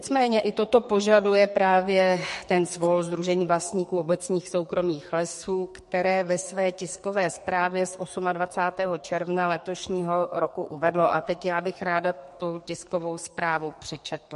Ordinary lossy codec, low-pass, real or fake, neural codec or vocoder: MP3, 48 kbps; 14.4 kHz; fake; codec, 44.1 kHz, 2.6 kbps, SNAC